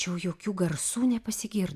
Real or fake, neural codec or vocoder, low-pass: real; none; 14.4 kHz